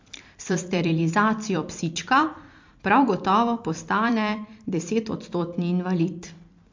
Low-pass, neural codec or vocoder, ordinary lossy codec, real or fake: 7.2 kHz; vocoder, 44.1 kHz, 128 mel bands every 256 samples, BigVGAN v2; MP3, 48 kbps; fake